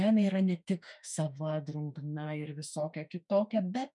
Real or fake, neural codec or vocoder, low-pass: fake; autoencoder, 48 kHz, 32 numbers a frame, DAC-VAE, trained on Japanese speech; 10.8 kHz